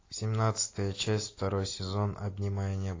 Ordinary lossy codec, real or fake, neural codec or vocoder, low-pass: AAC, 32 kbps; real; none; 7.2 kHz